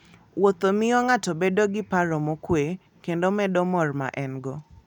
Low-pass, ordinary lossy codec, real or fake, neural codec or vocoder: 19.8 kHz; none; real; none